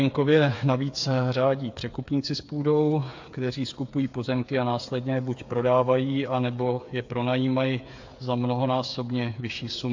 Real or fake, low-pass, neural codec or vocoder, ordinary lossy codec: fake; 7.2 kHz; codec, 16 kHz, 8 kbps, FreqCodec, smaller model; AAC, 48 kbps